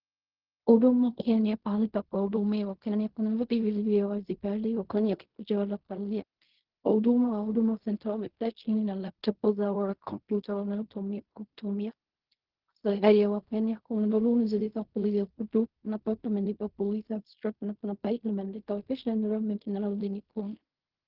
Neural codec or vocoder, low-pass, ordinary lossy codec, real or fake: codec, 16 kHz in and 24 kHz out, 0.4 kbps, LongCat-Audio-Codec, fine tuned four codebook decoder; 5.4 kHz; Opus, 16 kbps; fake